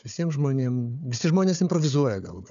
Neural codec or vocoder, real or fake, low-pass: codec, 16 kHz, 4 kbps, FunCodec, trained on Chinese and English, 50 frames a second; fake; 7.2 kHz